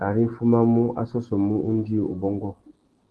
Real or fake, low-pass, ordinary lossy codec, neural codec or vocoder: real; 10.8 kHz; Opus, 16 kbps; none